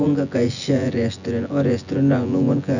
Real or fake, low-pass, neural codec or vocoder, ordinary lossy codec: fake; 7.2 kHz; vocoder, 24 kHz, 100 mel bands, Vocos; none